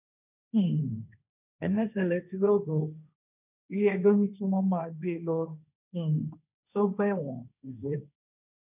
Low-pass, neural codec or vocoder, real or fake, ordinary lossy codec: 3.6 kHz; codec, 16 kHz, 1.1 kbps, Voila-Tokenizer; fake; none